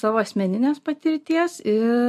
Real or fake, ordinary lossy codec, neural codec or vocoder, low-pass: real; MP3, 64 kbps; none; 14.4 kHz